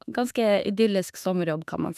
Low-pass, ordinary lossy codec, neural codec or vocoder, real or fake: 14.4 kHz; none; autoencoder, 48 kHz, 32 numbers a frame, DAC-VAE, trained on Japanese speech; fake